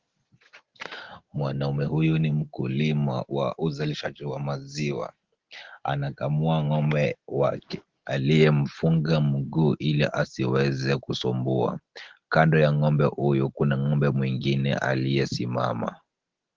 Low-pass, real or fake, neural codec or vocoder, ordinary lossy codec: 7.2 kHz; real; none; Opus, 16 kbps